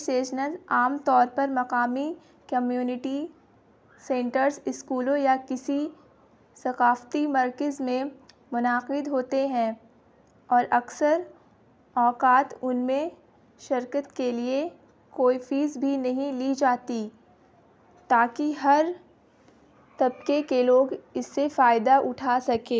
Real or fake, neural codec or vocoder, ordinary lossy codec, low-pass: real; none; none; none